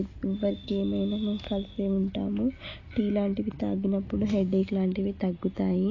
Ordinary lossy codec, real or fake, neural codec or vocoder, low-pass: AAC, 32 kbps; real; none; 7.2 kHz